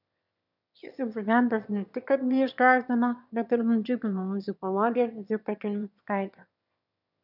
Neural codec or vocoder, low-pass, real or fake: autoencoder, 22.05 kHz, a latent of 192 numbers a frame, VITS, trained on one speaker; 5.4 kHz; fake